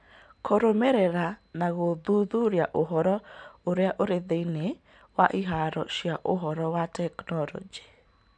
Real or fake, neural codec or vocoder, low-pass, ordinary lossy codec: real; none; 9.9 kHz; none